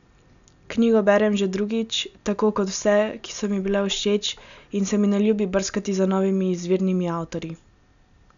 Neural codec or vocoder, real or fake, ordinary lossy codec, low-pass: none; real; none; 7.2 kHz